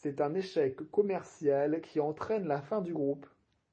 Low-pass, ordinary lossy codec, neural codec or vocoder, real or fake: 9.9 kHz; MP3, 32 kbps; none; real